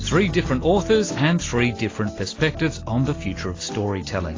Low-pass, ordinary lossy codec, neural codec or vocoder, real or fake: 7.2 kHz; AAC, 32 kbps; none; real